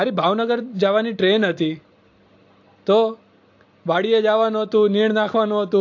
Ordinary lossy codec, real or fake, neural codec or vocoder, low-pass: AAC, 48 kbps; real; none; 7.2 kHz